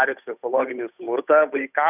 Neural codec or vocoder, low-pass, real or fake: codec, 16 kHz, 8 kbps, FunCodec, trained on Chinese and English, 25 frames a second; 3.6 kHz; fake